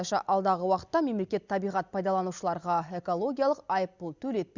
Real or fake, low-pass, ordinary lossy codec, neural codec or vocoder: real; 7.2 kHz; Opus, 64 kbps; none